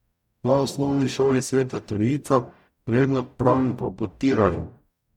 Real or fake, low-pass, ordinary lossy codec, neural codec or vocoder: fake; 19.8 kHz; none; codec, 44.1 kHz, 0.9 kbps, DAC